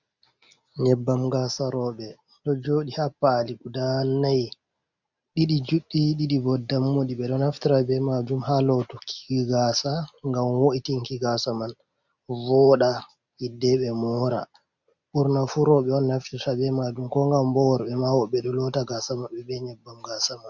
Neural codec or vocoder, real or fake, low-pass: none; real; 7.2 kHz